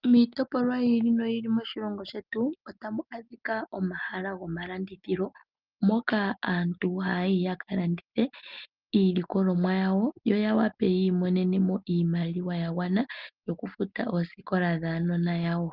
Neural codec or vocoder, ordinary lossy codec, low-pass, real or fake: none; Opus, 24 kbps; 5.4 kHz; real